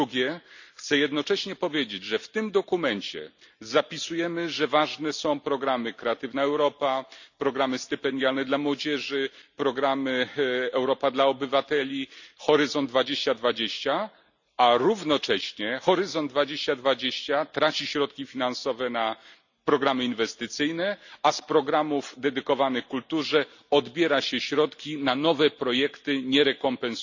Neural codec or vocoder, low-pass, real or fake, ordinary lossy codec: none; 7.2 kHz; real; none